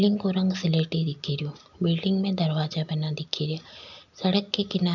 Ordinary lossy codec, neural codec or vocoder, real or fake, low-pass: none; none; real; 7.2 kHz